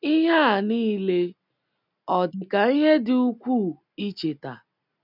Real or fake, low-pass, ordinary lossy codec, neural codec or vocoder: real; 5.4 kHz; none; none